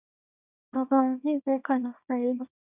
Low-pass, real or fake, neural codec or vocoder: 3.6 kHz; fake; codec, 24 kHz, 0.9 kbps, WavTokenizer, small release